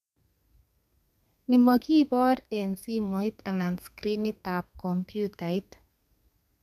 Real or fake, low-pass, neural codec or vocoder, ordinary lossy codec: fake; 14.4 kHz; codec, 32 kHz, 1.9 kbps, SNAC; none